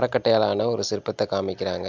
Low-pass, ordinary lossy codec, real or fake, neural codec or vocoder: 7.2 kHz; MP3, 64 kbps; real; none